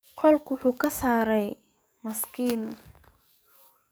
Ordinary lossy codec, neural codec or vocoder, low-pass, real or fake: none; codec, 44.1 kHz, 7.8 kbps, DAC; none; fake